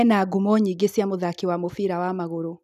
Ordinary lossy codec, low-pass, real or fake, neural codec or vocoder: none; 14.4 kHz; real; none